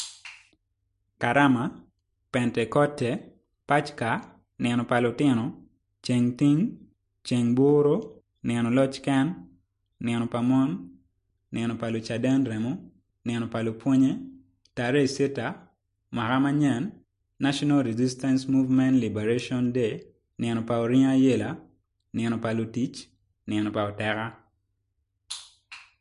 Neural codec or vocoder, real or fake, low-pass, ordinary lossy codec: none; real; 10.8 kHz; MP3, 48 kbps